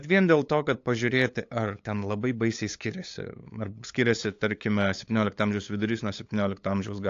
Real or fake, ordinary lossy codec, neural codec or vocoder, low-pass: fake; AAC, 48 kbps; codec, 16 kHz, 8 kbps, FunCodec, trained on LibriTTS, 25 frames a second; 7.2 kHz